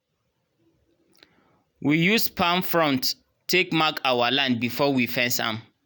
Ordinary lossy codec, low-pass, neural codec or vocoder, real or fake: none; none; none; real